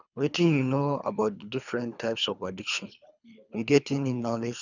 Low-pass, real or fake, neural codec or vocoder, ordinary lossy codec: 7.2 kHz; fake; codec, 24 kHz, 3 kbps, HILCodec; none